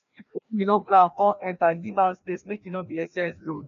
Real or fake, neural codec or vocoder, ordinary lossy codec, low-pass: fake; codec, 16 kHz, 1 kbps, FreqCodec, larger model; none; 7.2 kHz